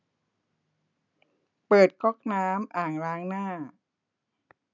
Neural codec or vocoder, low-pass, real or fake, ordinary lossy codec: none; 7.2 kHz; real; none